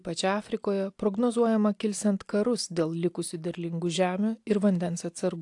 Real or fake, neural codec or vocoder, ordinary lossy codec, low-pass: real; none; AAC, 64 kbps; 10.8 kHz